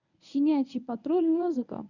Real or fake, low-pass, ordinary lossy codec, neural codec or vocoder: fake; 7.2 kHz; none; codec, 24 kHz, 0.9 kbps, WavTokenizer, medium speech release version 1